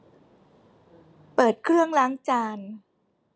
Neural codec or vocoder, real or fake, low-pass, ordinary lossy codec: none; real; none; none